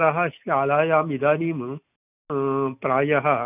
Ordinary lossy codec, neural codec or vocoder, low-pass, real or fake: none; none; 3.6 kHz; real